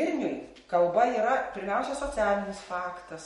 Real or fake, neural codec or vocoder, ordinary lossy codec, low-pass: real; none; MP3, 48 kbps; 19.8 kHz